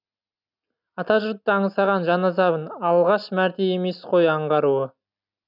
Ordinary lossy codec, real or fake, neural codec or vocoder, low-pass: none; real; none; 5.4 kHz